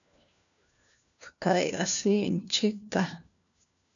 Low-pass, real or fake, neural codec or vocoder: 7.2 kHz; fake; codec, 16 kHz, 1 kbps, FunCodec, trained on LibriTTS, 50 frames a second